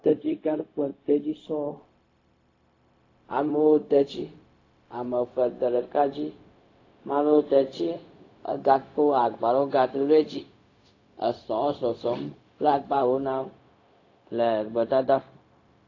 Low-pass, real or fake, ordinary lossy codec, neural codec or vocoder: 7.2 kHz; fake; AAC, 32 kbps; codec, 16 kHz, 0.4 kbps, LongCat-Audio-Codec